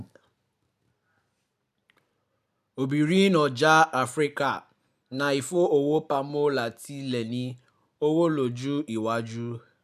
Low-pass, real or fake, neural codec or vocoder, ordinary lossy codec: 14.4 kHz; real; none; none